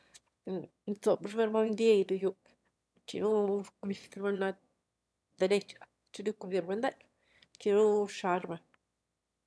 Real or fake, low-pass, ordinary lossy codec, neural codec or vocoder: fake; none; none; autoencoder, 22.05 kHz, a latent of 192 numbers a frame, VITS, trained on one speaker